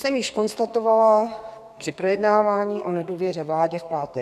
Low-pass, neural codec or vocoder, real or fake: 14.4 kHz; codec, 32 kHz, 1.9 kbps, SNAC; fake